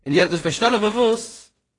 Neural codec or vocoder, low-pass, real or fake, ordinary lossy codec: codec, 16 kHz in and 24 kHz out, 0.4 kbps, LongCat-Audio-Codec, two codebook decoder; 10.8 kHz; fake; AAC, 32 kbps